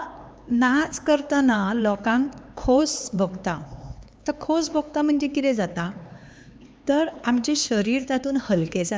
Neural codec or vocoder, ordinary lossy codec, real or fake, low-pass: codec, 16 kHz, 4 kbps, X-Codec, HuBERT features, trained on LibriSpeech; none; fake; none